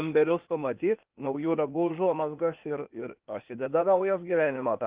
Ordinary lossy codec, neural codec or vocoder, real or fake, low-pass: Opus, 24 kbps; codec, 16 kHz, 0.8 kbps, ZipCodec; fake; 3.6 kHz